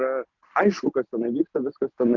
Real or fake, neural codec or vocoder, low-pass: real; none; 7.2 kHz